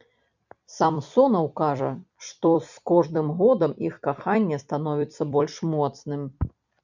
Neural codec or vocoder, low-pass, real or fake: vocoder, 44.1 kHz, 128 mel bands every 256 samples, BigVGAN v2; 7.2 kHz; fake